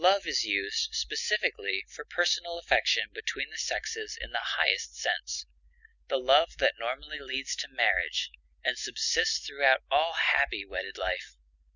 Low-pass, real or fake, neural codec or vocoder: 7.2 kHz; real; none